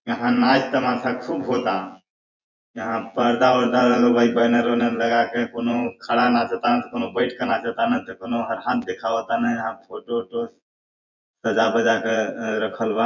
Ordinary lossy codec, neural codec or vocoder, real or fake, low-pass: none; vocoder, 24 kHz, 100 mel bands, Vocos; fake; 7.2 kHz